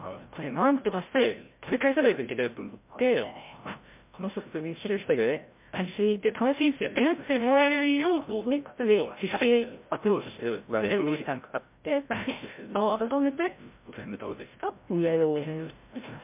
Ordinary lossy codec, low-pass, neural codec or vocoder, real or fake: MP3, 32 kbps; 3.6 kHz; codec, 16 kHz, 0.5 kbps, FreqCodec, larger model; fake